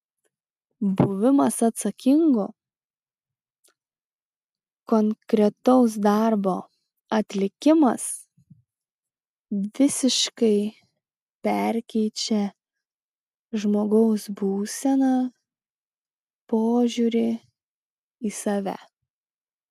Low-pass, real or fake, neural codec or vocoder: 14.4 kHz; real; none